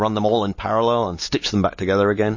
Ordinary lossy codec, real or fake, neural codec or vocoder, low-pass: MP3, 32 kbps; real; none; 7.2 kHz